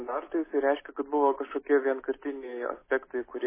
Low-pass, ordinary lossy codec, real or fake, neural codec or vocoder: 3.6 kHz; MP3, 16 kbps; real; none